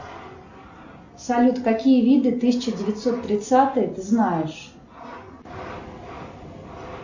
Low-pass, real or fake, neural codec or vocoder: 7.2 kHz; real; none